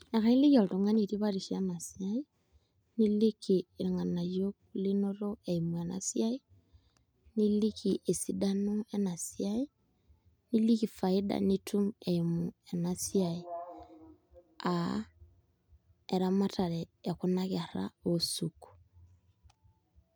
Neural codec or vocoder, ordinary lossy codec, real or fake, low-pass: none; none; real; none